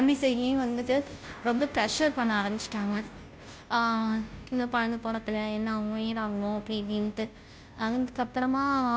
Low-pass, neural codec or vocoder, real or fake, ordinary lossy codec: none; codec, 16 kHz, 0.5 kbps, FunCodec, trained on Chinese and English, 25 frames a second; fake; none